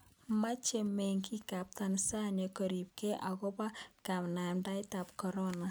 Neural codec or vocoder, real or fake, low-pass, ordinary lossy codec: none; real; none; none